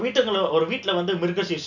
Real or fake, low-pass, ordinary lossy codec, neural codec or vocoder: real; 7.2 kHz; none; none